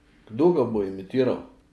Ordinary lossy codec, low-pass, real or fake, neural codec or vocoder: none; none; real; none